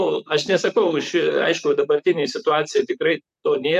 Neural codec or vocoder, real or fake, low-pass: vocoder, 44.1 kHz, 128 mel bands, Pupu-Vocoder; fake; 14.4 kHz